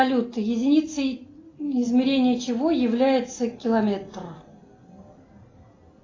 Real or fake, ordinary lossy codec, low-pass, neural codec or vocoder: real; AAC, 32 kbps; 7.2 kHz; none